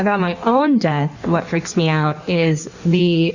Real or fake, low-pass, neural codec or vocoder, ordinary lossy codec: fake; 7.2 kHz; codec, 16 kHz in and 24 kHz out, 1.1 kbps, FireRedTTS-2 codec; Opus, 64 kbps